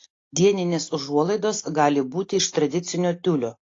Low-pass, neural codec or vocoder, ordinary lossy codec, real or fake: 7.2 kHz; none; AAC, 32 kbps; real